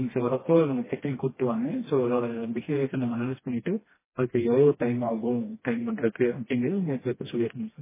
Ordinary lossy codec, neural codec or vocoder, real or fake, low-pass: MP3, 16 kbps; codec, 16 kHz, 1 kbps, FreqCodec, smaller model; fake; 3.6 kHz